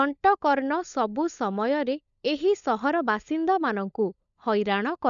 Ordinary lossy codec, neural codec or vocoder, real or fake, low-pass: none; codec, 16 kHz, 16 kbps, FunCodec, trained on LibriTTS, 50 frames a second; fake; 7.2 kHz